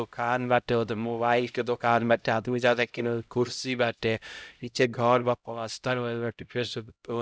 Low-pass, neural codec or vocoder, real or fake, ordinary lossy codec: none; codec, 16 kHz, 0.5 kbps, X-Codec, HuBERT features, trained on LibriSpeech; fake; none